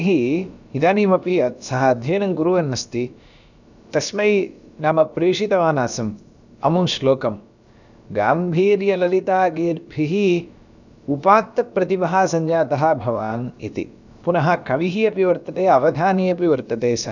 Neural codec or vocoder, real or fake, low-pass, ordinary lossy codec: codec, 16 kHz, about 1 kbps, DyCAST, with the encoder's durations; fake; 7.2 kHz; none